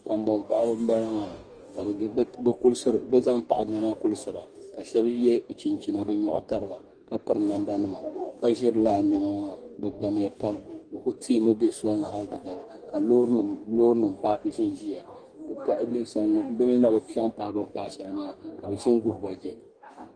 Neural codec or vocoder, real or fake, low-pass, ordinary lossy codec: codec, 44.1 kHz, 2.6 kbps, DAC; fake; 9.9 kHz; Opus, 24 kbps